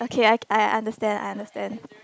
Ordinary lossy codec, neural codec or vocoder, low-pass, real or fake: none; none; none; real